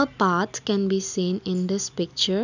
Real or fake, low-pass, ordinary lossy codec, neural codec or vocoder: real; 7.2 kHz; none; none